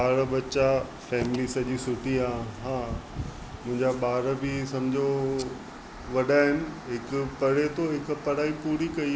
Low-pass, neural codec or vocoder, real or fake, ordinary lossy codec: none; none; real; none